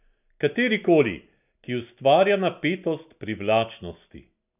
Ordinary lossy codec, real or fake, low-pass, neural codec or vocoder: none; real; 3.6 kHz; none